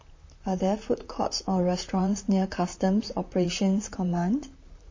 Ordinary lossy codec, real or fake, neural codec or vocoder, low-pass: MP3, 32 kbps; fake; vocoder, 44.1 kHz, 128 mel bands, Pupu-Vocoder; 7.2 kHz